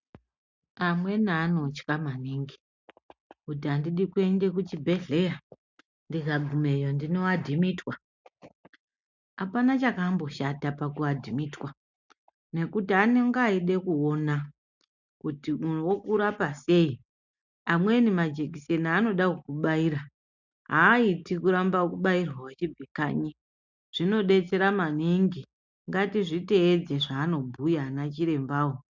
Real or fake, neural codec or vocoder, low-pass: real; none; 7.2 kHz